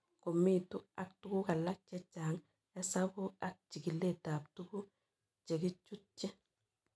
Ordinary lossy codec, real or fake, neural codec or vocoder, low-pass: none; real; none; none